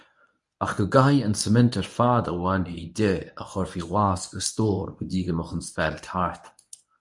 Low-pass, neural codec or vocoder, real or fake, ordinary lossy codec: 10.8 kHz; codec, 24 kHz, 0.9 kbps, WavTokenizer, medium speech release version 1; fake; MP3, 96 kbps